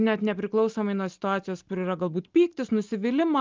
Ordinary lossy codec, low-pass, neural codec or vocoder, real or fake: Opus, 24 kbps; 7.2 kHz; none; real